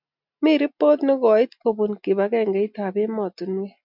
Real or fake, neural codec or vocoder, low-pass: real; none; 5.4 kHz